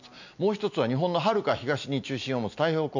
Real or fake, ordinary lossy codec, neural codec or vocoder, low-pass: real; none; none; 7.2 kHz